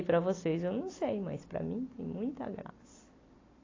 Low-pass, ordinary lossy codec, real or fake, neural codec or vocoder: 7.2 kHz; AAC, 32 kbps; real; none